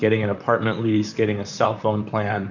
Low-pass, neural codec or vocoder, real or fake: 7.2 kHz; vocoder, 44.1 kHz, 128 mel bands, Pupu-Vocoder; fake